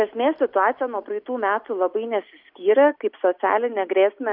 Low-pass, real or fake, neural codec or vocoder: 5.4 kHz; real; none